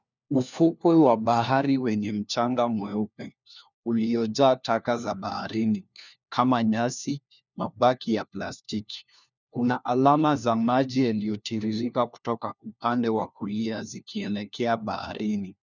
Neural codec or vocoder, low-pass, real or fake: codec, 16 kHz, 1 kbps, FunCodec, trained on LibriTTS, 50 frames a second; 7.2 kHz; fake